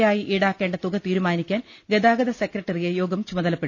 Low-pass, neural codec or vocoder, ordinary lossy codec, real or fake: none; none; none; real